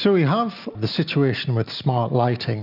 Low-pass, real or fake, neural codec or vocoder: 5.4 kHz; real; none